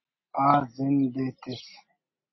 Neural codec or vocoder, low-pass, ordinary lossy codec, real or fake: none; 7.2 kHz; MP3, 24 kbps; real